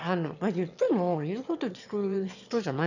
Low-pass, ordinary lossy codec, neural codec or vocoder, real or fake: 7.2 kHz; none; autoencoder, 22.05 kHz, a latent of 192 numbers a frame, VITS, trained on one speaker; fake